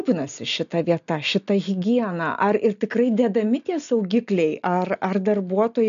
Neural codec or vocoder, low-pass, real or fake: none; 7.2 kHz; real